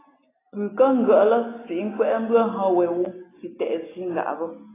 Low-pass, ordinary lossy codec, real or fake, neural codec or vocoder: 3.6 kHz; AAC, 16 kbps; real; none